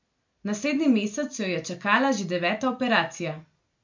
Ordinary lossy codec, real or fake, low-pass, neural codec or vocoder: MP3, 48 kbps; real; 7.2 kHz; none